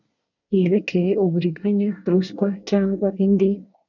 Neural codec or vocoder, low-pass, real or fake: codec, 24 kHz, 1 kbps, SNAC; 7.2 kHz; fake